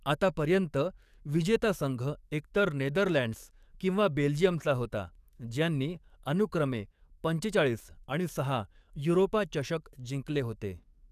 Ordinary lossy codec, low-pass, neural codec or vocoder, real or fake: none; 14.4 kHz; codec, 44.1 kHz, 7.8 kbps, DAC; fake